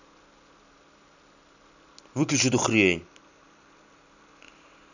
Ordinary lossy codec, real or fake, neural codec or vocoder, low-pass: none; real; none; 7.2 kHz